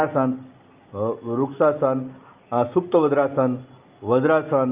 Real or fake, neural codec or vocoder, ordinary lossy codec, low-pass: real; none; Opus, 24 kbps; 3.6 kHz